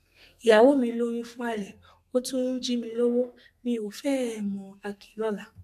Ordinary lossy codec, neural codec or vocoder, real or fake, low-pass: none; codec, 32 kHz, 1.9 kbps, SNAC; fake; 14.4 kHz